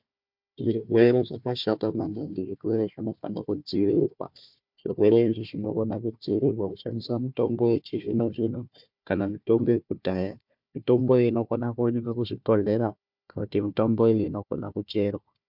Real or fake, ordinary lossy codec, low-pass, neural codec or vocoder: fake; MP3, 48 kbps; 5.4 kHz; codec, 16 kHz, 1 kbps, FunCodec, trained on Chinese and English, 50 frames a second